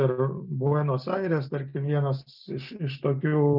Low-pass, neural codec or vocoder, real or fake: 5.4 kHz; none; real